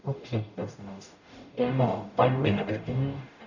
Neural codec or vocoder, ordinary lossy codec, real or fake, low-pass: codec, 44.1 kHz, 0.9 kbps, DAC; none; fake; 7.2 kHz